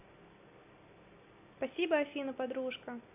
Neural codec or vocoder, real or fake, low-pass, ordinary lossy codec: none; real; 3.6 kHz; AAC, 24 kbps